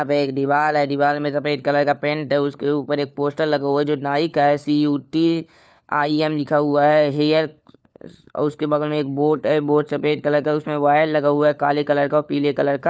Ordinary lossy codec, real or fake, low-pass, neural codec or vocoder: none; fake; none; codec, 16 kHz, 4 kbps, FunCodec, trained on LibriTTS, 50 frames a second